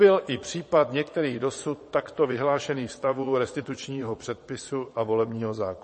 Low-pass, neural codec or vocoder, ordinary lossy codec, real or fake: 9.9 kHz; vocoder, 22.05 kHz, 80 mel bands, Vocos; MP3, 32 kbps; fake